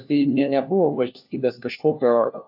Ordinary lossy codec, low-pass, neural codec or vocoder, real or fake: AAC, 48 kbps; 5.4 kHz; codec, 16 kHz, 1 kbps, FunCodec, trained on LibriTTS, 50 frames a second; fake